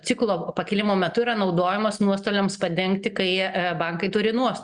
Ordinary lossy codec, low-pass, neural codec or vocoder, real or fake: Opus, 64 kbps; 9.9 kHz; none; real